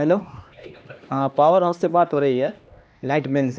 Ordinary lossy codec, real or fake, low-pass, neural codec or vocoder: none; fake; none; codec, 16 kHz, 2 kbps, X-Codec, HuBERT features, trained on LibriSpeech